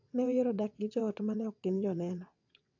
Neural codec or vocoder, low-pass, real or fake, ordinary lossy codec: vocoder, 22.05 kHz, 80 mel bands, WaveNeXt; 7.2 kHz; fake; AAC, 48 kbps